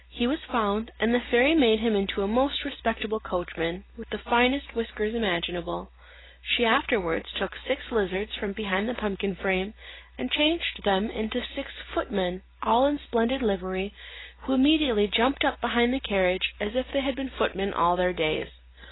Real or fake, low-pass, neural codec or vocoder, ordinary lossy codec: real; 7.2 kHz; none; AAC, 16 kbps